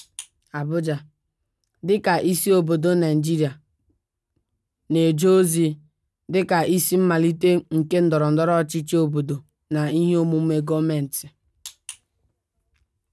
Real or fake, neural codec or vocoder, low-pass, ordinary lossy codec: real; none; none; none